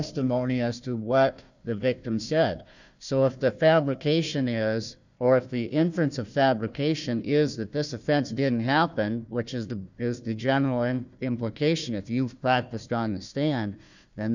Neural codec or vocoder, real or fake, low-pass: codec, 16 kHz, 1 kbps, FunCodec, trained on Chinese and English, 50 frames a second; fake; 7.2 kHz